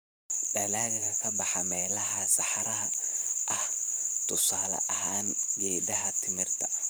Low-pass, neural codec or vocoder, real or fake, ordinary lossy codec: none; vocoder, 44.1 kHz, 128 mel bands every 512 samples, BigVGAN v2; fake; none